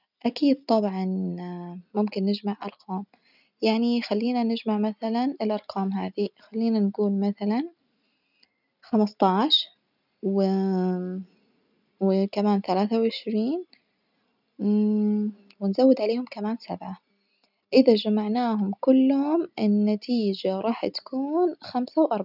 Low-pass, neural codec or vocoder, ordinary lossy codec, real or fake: 5.4 kHz; none; none; real